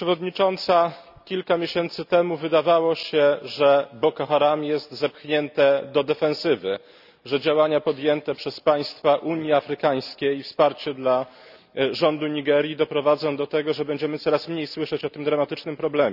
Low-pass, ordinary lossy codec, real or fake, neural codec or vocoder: 5.4 kHz; none; real; none